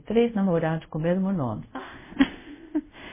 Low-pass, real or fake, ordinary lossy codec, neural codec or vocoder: 3.6 kHz; fake; MP3, 16 kbps; codec, 24 kHz, 0.5 kbps, DualCodec